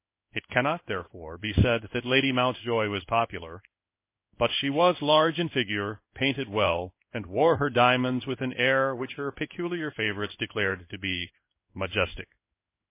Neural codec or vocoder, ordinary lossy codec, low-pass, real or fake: codec, 16 kHz in and 24 kHz out, 1 kbps, XY-Tokenizer; MP3, 24 kbps; 3.6 kHz; fake